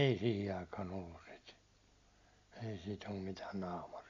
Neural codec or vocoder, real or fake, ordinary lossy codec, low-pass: none; real; MP3, 48 kbps; 7.2 kHz